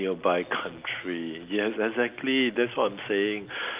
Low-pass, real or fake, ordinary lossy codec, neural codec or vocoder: 3.6 kHz; real; Opus, 24 kbps; none